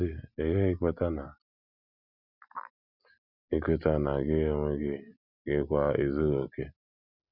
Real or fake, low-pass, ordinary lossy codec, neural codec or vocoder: real; 5.4 kHz; none; none